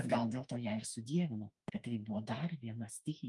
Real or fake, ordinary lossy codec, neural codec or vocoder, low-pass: fake; Opus, 32 kbps; autoencoder, 48 kHz, 32 numbers a frame, DAC-VAE, trained on Japanese speech; 10.8 kHz